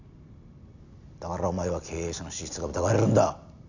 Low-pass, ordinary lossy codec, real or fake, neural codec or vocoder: 7.2 kHz; none; real; none